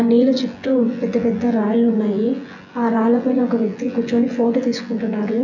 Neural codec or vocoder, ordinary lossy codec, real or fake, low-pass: vocoder, 24 kHz, 100 mel bands, Vocos; none; fake; 7.2 kHz